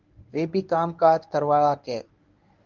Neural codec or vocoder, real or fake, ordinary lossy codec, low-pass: codec, 24 kHz, 0.9 kbps, WavTokenizer, medium speech release version 1; fake; Opus, 24 kbps; 7.2 kHz